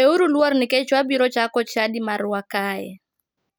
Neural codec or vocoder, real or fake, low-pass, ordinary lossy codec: none; real; none; none